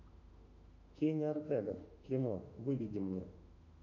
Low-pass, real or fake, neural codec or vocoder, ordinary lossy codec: 7.2 kHz; fake; autoencoder, 48 kHz, 32 numbers a frame, DAC-VAE, trained on Japanese speech; AAC, 48 kbps